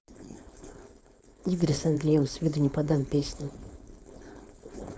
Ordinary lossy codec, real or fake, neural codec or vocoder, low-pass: none; fake; codec, 16 kHz, 4.8 kbps, FACodec; none